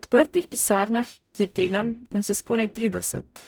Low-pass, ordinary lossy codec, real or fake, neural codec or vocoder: none; none; fake; codec, 44.1 kHz, 0.9 kbps, DAC